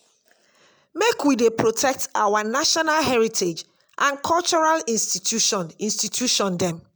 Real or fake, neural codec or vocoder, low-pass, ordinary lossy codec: real; none; none; none